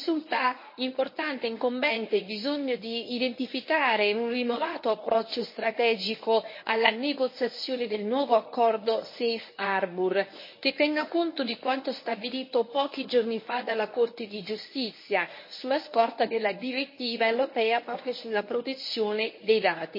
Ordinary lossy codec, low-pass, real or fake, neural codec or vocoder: MP3, 24 kbps; 5.4 kHz; fake; codec, 24 kHz, 0.9 kbps, WavTokenizer, medium speech release version 1